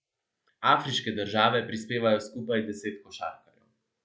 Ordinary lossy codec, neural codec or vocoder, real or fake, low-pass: none; none; real; none